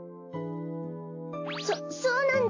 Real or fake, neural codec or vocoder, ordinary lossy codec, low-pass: real; none; AAC, 32 kbps; 7.2 kHz